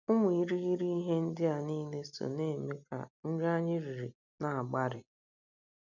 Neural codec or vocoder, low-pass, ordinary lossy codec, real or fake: none; 7.2 kHz; none; real